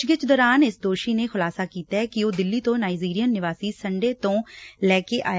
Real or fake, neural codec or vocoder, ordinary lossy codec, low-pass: real; none; none; none